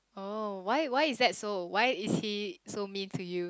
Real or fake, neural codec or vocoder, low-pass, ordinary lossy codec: real; none; none; none